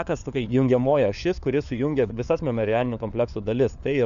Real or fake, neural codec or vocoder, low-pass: fake; codec, 16 kHz, 4 kbps, FunCodec, trained on LibriTTS, 50 frames a second; 7.2 kHz